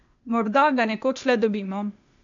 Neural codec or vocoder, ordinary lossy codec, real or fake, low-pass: codec, 16 kHz, 0.8 kbps, ZipCodec; none; fake; 7.2 kHz